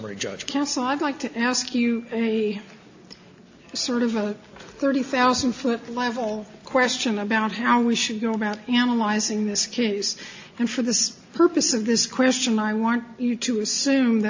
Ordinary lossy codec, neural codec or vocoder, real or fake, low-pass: AAC, 48 kbps; none; real; 7.2 kHz